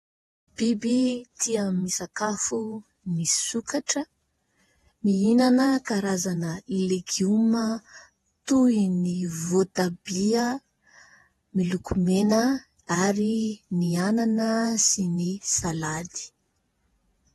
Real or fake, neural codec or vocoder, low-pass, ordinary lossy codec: fake; vocoder, 48 kHz, 128 mel bands, Vocos; 19.8 kHz; AAC, 32 kbps